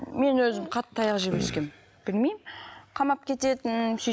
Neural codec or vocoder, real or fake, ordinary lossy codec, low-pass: none; real; none; none